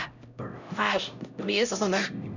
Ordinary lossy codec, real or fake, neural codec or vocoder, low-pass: none; fake; codec, 16 kHz, 0.5 kbps, X-Codec, HuBERT features, trained on LibriSpeech; 7.2 kHz